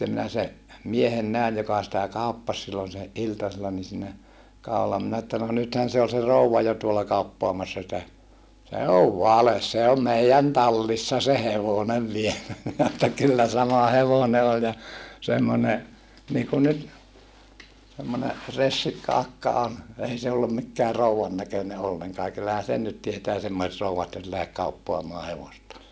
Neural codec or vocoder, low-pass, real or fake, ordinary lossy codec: none; none; real; none